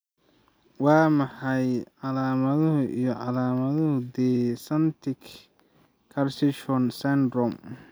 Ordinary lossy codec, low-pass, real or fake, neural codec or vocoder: none; none; real; none